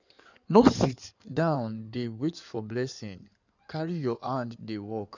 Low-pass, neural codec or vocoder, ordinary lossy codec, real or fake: 7.2 kHz; codec, 16 kHz in and 24 kHz out, 2.2 kbps, FireRedTTS-2 codec; none; fake